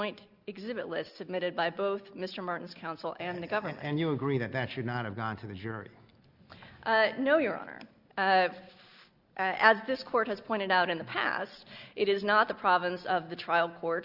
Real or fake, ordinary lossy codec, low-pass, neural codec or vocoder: real; Opus, 64 kbps; 5.4 kHz; none